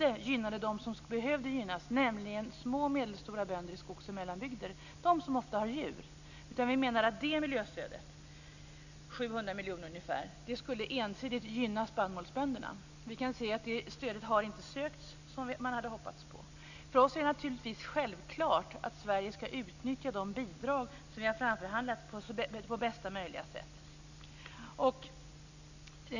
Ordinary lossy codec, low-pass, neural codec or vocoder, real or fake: none; 7.2 kHz; none; real